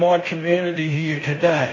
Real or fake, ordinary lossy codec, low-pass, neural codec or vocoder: fake; MP3, 32 kbps; 7.2 kHz; codec, 16 kHz in and 24 kHz out, 0.9 kbps, LongCat-Audio-Codec, fine tuned four codebook decoder